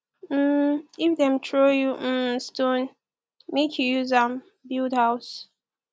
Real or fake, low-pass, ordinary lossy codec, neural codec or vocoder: real; none; none; none